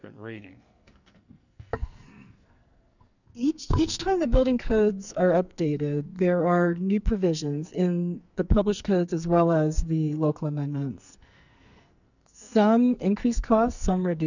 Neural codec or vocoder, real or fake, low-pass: codec, 44.1 kHz, 2.6 kbps, SNAC; fake; 7.2 kHz